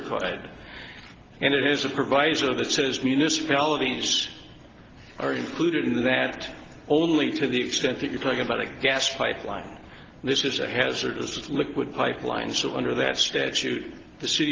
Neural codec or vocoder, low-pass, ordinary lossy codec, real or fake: none; 7.2 kHz; Opus, 16 kbps; real